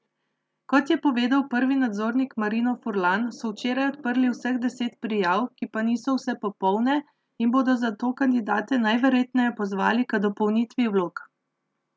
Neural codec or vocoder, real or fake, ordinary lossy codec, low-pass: none; real; none; none